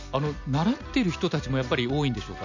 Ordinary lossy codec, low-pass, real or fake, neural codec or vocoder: none; 7.2 kHz; real; none